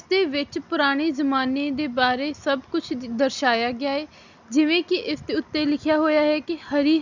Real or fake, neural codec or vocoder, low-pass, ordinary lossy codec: real; none; 7.2 kHz; none